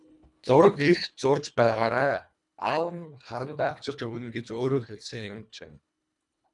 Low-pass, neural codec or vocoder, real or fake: 10.8 kHz; codec, 24 kHz, 1.5 kbps, HILCodec; fake